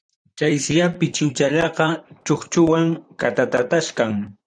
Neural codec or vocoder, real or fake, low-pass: vocoder, 44.1 kHz, 128 mel bands, Pupu-Vocoder; fake; 9.9 kHz